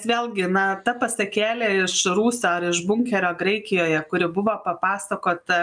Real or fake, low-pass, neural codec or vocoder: real; 9.9 kHz; none